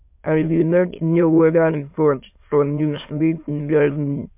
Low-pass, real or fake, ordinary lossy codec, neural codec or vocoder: 3.6 kHz; fake; MP3, 32 kbps; autoencoder, 22.05 kHz, a latent of 192 numbers a frame, VITS, trained on many speakers